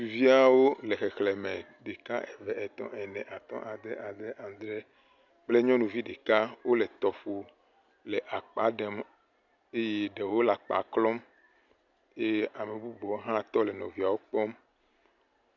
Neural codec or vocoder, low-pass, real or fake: none; 7.2 kHz; real